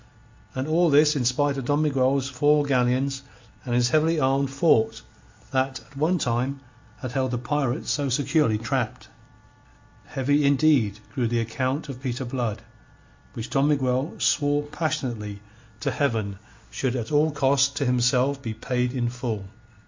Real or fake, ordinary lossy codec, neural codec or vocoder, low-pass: real; MP3, 64 kbps; none; 7.2 kHz